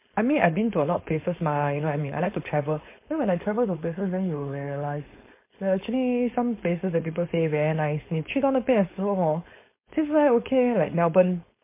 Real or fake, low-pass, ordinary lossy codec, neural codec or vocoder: fake; 3.6 kHz; MP3, 24 kbps; codec, 16 kHz, 4.8 kbps, FACodec